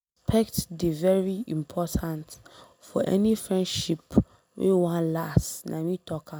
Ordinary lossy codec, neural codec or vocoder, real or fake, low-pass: none; none; real; none